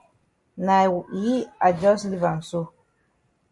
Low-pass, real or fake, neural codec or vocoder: 10.8 kHz; real; none